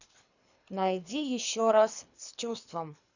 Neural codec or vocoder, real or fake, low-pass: codec, 24 kHz, 3 kbps, HILCodec; fake; 7.2 kHz